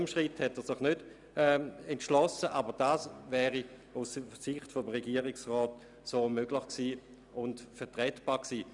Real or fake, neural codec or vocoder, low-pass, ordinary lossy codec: real; none; 10.8 kHz; none